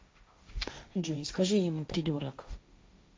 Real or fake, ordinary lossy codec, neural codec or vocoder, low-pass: fake; none; codec, 16 kHz, 1.1 kbps, Voila-Tokenizer; none